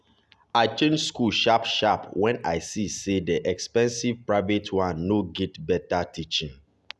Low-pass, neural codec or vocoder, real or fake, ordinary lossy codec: none; none; real; none